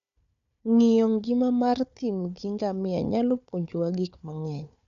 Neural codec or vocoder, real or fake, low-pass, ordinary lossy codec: codec, 16 kHz, 4 kbps, FunCodec, trained on Chinese and English, 50 frames a second; fake; 7.2 kHz; none